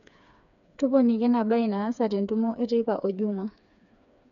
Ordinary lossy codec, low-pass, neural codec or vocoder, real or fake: none; 7.2 kHz; codec, 16 kHz, 4 kbps, FreqCodec, smaller model; fake